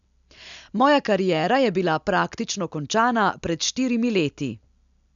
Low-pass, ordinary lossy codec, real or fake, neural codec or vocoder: 7.2 kHz; MP3, 96 kbps; real; none